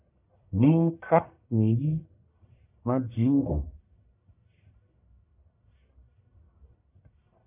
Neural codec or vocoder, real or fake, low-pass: codec, 44.1 kHz, 1.7 kbps, Pupu-Codec; fake; 3.6 kHz